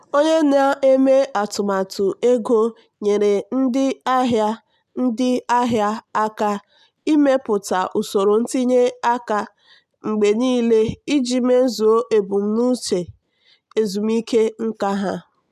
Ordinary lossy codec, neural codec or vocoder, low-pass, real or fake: none; none; 14.4 kHz; real